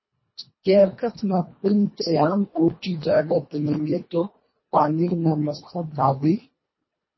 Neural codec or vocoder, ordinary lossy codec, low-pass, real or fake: codec, 24 kHz, 1.5 kbps, HILCodec; MP3, 24 kbps; 7.2 kHz; fake